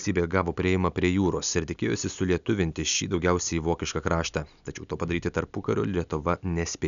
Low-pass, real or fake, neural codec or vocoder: 7.2 kHz; real; none